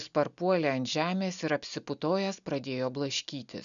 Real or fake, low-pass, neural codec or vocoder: real; 7.2 kHz; none